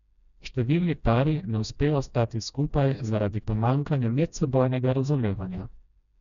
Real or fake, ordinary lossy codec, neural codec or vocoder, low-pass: fake; none; codec, 16 kHz, 1 kbps, FreqCodec, smaller model; 7.2 kHz